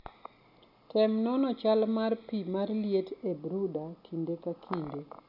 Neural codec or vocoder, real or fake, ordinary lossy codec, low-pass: none; real; none; 5.4 kHz